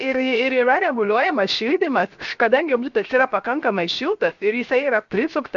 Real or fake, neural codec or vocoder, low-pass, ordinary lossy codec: fake; codec, 16 kHz, 0.7 kbps, FocalCodec; 7.2 kHz; MP3, 64 kbps